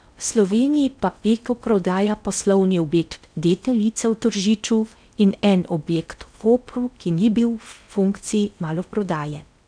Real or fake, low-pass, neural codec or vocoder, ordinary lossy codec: fake; 9.9 kHz; codec, 16 kHz in and 24 kHz out, 0.6 kbps, FocalCodec, streaming, 4096 codes; none